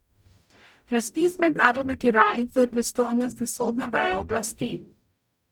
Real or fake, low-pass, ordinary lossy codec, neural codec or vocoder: fake; 19.8 kHz; none; codec, 44.1 kHz, 0.9 kbps, DAC